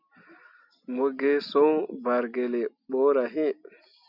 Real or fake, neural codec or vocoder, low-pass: real; none; 5.4 kHz